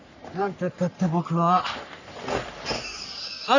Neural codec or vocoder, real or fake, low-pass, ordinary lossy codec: codec, 44.1 kHz, 3.4 kbps, Pupu-Codec; fake; 7.2 kHz; none